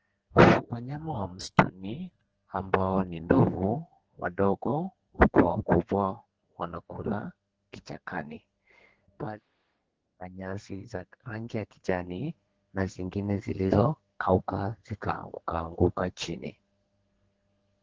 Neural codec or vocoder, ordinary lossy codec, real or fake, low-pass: codec, 32 kHz, 1.9 kbps, SNAC; Opus, 16 kbps; fake; 7.2 kHz